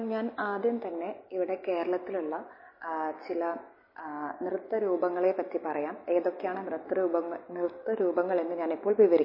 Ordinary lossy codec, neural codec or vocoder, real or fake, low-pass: MP3, 24 kbps; none; real; 5.4 kHz